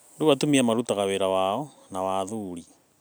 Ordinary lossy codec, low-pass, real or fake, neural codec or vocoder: none; none; real; none